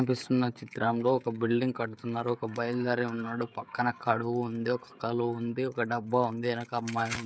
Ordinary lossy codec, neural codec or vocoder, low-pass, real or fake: none; codec, 16 kHz, 16 kbps, FreqCodec, smaller model; none; fake